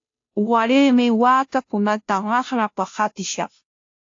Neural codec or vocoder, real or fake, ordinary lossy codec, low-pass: codec, 16 kHz, 0.5 kbps, FunCodec, trained on Chinese and English, 25 frames a second; fake; AAC, 48 kbps; 7.2 kHz